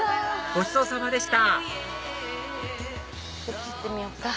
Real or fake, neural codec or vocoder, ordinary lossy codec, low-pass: real; none; none; none